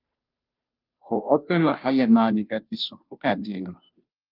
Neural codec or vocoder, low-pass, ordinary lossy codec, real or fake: codec, 16 kHz, 0.5 kbps, FunCodec, trained on Chinese and English, 25 frames a second; 5.4 kHz; Opus, 24 kbps; fake